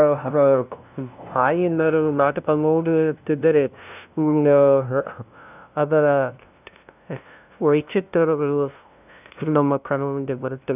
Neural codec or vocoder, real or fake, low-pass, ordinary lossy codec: codec, 16 kHz, 0.5 kbps, FunCodec, trained on LibriTTS, 25 frames a second; fake; 3.6 kHz; none